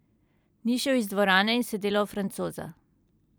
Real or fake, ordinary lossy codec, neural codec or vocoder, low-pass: fake; none; vocoder, 44.1 kHz, 128 mel bands every 256 samples, BigVGAN v2; none